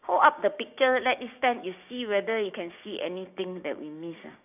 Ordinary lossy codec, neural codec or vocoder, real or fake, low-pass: none; codec, 16 kHz, 6 kbps, DAC; fake; 3.6 kHz